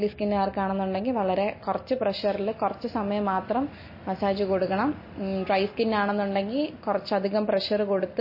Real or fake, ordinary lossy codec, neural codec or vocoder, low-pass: real; MP3, 24 kbps; none; 5.4 kHz